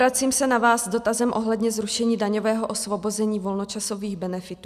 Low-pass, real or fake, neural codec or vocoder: 14.4 kHz; real; none